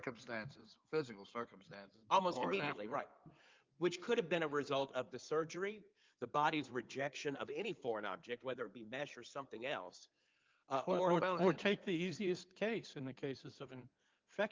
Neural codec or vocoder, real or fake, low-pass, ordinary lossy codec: codec, 16 kHz in and 24 kHz out, 2.2 kbps, FireRedTTS-2 codec; fake; 7.2 kHz; Opus, 24 kbps